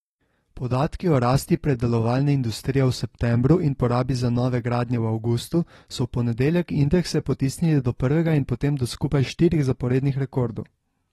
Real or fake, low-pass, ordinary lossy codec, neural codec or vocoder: real; 19.8 kHz; AAC, 32 kbps; none